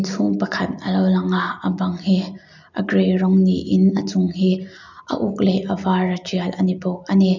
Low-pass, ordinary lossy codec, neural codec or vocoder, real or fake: 7.2 kHz; none; none; real